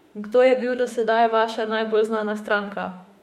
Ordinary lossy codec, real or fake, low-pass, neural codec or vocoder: MP3, 64 kbps; fake; 19.8 kHz; autoencoder, 48 kHz, 32 numbers a frame, DAC-VAE, trained on Japanese speech